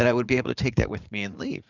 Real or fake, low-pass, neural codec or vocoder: fake; 7.2 kHz; vocoder, 44.1 kHz, 80 mel bands, Vocos